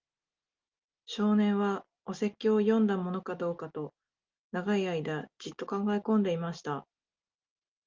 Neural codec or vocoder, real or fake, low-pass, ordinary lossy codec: none; real; 7.2 kHz; Opus, 32 kbps